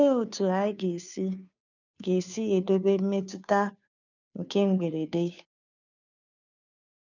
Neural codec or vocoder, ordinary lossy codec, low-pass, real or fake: codec, 16 kHz, 2 kbps, FunCodec, trained on Chinese and English, 25 frames a second; none; 7.2 kHz; fake